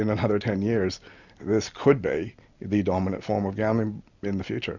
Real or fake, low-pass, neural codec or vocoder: real; 7.2 kHz; none